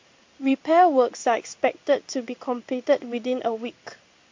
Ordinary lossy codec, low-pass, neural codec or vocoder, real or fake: MP3, 48 kbps; 7.2 kHz; codec, 16 kHz in and 24 kHz out, 1 kbps, XY-Tokenizer; fake